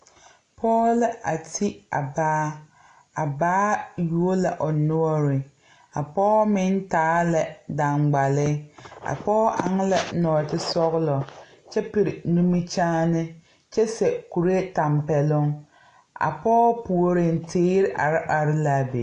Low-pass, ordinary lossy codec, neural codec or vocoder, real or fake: 9.9 kHz; AAC, 48 kbps; none; real